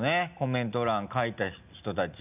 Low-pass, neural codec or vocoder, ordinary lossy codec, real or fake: 3.6 kHz; none; none; real